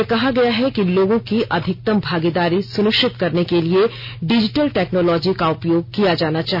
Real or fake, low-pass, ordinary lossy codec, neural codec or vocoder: real; 5.4 kHz; none; none